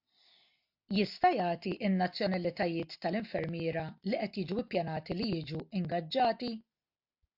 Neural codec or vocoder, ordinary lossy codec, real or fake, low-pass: none; AAC, 48 kbps; real; 5.4 kHz